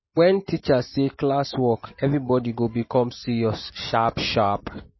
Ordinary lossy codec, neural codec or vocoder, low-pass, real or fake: MP3, 24 kbps; none; 7.2 kHz; real